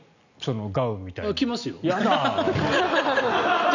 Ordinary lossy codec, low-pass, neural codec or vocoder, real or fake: none; 7.2 kHz; none; real